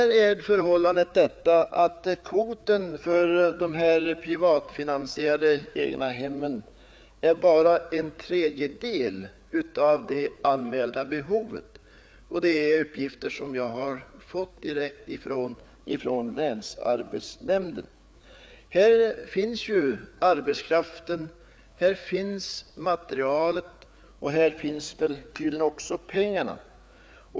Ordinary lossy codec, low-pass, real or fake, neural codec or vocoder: none; none; fake; codec, 16 kHz, 4 kbps, FreqCodec, larger model